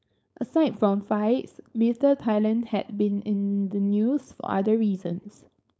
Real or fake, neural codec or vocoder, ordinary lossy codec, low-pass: fake; codec, 16 kHz, 4.8 kbps, FACodec; none; none